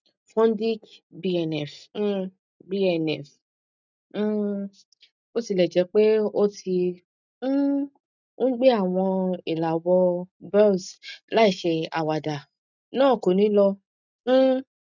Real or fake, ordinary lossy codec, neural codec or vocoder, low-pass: real; none; none; 7.2 kHz